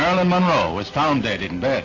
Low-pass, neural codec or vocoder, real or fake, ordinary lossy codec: 7.2 kHz; none; real; AAC, 32 kbps